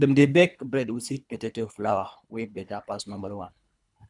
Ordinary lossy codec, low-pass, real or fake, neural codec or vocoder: none; 10.8 kHz; fake; codec, 24 kHz, 3 kbps, HILCodec